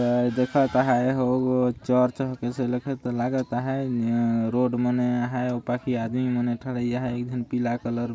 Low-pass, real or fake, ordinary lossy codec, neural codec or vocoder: none; real; none; none